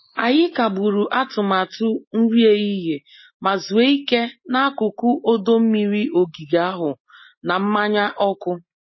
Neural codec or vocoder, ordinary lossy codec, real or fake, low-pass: none; MP3, 24 kbps; real; 7.2 kHz